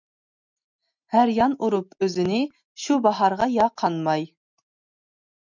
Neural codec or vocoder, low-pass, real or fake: none; 7.2 kHz; real